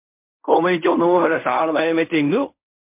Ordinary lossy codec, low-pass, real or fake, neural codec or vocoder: MP3, 32 kbps; 3.6 kHz; fake; codec, 16 kHz in and 24 kHz out, 0.4 kbps, LongCat-Audio-Codec, fine tuned four codebook decoder